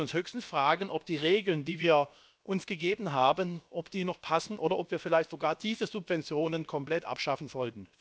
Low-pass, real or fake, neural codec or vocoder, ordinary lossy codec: none; fake; codec, 16 kHz, about 1 kbps, DyCAST, with the encoder's durations; none